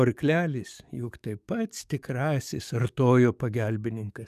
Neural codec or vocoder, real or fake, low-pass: codec, 44.1 kHz, 7.8 kbps, DAC; fake; 14.4 kHz